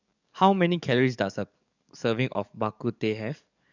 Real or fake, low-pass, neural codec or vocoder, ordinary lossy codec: fake; 7.2 kHz; codec, 44.1 kHz, 7.8 kbps, DAC; none